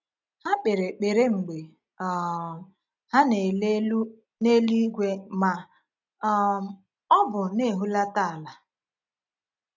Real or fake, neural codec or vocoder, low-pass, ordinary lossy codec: real; none; 7.2 kHz; none